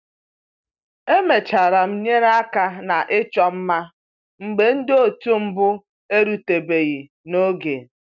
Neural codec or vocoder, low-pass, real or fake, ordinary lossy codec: none; 7.2 kHz; real; none